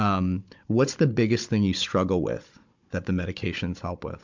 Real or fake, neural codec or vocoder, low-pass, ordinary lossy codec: fake; codec, 16 kHz, 16 kbps, FunCodec, trained on Chinese and English, 50 frames a second; 7.2 kHz; MP3, 64 kbps